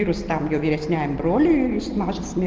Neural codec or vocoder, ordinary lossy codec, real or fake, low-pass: none; Opus, 16 kbps; real; 7.2 kHz